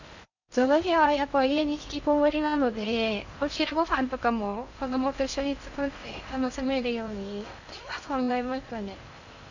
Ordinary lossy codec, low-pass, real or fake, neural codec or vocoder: none; 7.2 kHz; fake; codec, 16 kHz in and 24 kHz out, 0.6 kbps, FocalCodec, streaming, 2048 codes